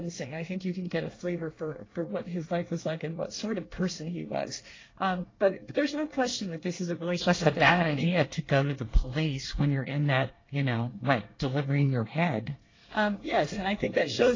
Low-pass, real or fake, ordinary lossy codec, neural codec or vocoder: 7.2 kHz; fake; AAC, 32 kbps; codec, 24 kHz, 1 kbps, SNAC